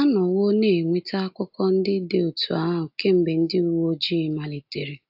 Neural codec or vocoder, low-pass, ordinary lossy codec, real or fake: none; 5.4 kHz; AAC, 48 kbps; real